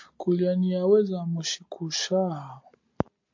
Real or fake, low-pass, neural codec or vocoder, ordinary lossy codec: real; 7.2 kHz; none; MP3, 48 kbps